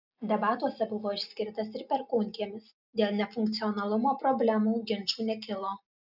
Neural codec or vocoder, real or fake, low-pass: none; real; 5.4 kHz